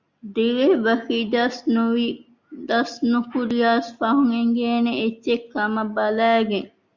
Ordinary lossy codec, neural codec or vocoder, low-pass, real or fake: Opus, 64 kbps; none; 7.2 kHz; real